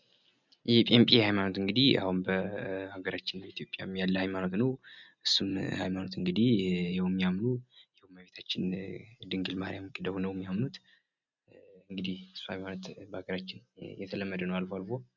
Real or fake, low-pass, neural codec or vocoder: real; 7.2 kHz; none